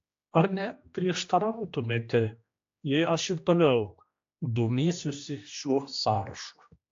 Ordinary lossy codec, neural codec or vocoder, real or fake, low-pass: AAC, 48 kbps; codec, 16 kHz, 1 kbps, X-Codec, HuBERT features, trained on general audio; fake; 7.2 kHz